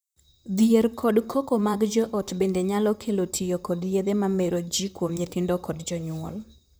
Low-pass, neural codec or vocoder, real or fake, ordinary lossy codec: none; vocoder, 44.1 kHz, 128 mel bands, Pupu-Vocoder; fake; none